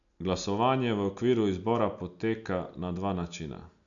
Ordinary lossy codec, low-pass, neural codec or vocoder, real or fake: none; 7.2 kHz; none; real